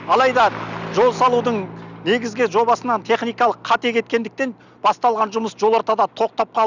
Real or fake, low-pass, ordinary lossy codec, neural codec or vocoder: real; 7.2 kHz; none; none